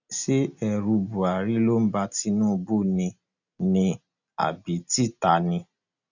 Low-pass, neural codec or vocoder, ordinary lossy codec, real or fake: 7.2 kHz; none; none; real